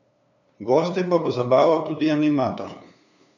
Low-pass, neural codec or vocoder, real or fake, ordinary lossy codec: 7.2 kHz; codec, 16 kHz, 2 kbps, FunCodec, trained on LibriTTS, 25 frames a second; fake; none